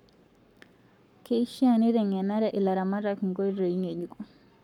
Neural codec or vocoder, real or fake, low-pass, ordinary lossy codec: codec, 44.1 kHz, 7.8 kbps, Pupu-Codec; fake; 19.8 kHz; none